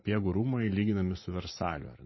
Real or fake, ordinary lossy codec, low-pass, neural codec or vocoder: fake; MP3, 24 kbps; 7.2 kHz; vocoder, 44.1 kHz, 128 mel bands every 512 samples, BigVGAN v2